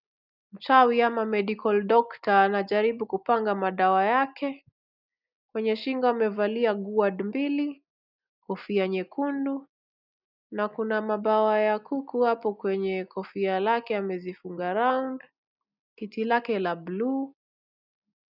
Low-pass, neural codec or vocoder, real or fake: 5.4 kHz; none; real